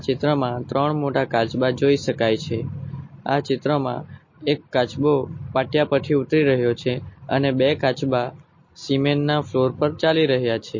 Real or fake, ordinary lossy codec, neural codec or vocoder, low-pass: real; MP3, 32 kbps; none; 7.2 kHz